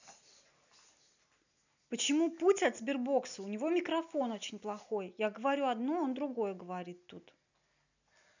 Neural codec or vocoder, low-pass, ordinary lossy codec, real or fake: none; 7.2 kHz; none; real